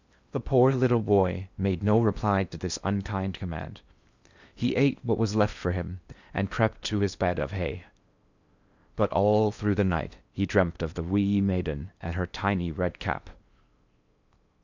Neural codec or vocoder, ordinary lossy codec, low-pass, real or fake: codec, 16 kHz in and 24 kHz out, 0.8 kbps, FocalCodec, streaming, 65536 codes; Opus, 64 kbps; 7.2 kHz; fake